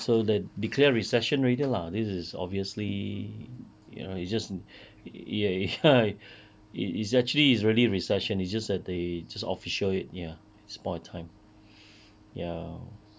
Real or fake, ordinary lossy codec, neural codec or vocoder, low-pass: real; none; none; none